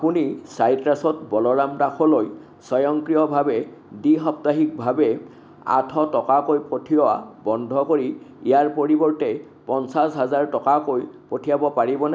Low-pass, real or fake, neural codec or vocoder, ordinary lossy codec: none; real; none; none